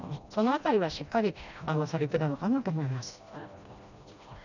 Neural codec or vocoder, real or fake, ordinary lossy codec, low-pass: codec, 16 kHz, 1 kbps, FreqCodec, smaller model; fake; none; 7.2 kHz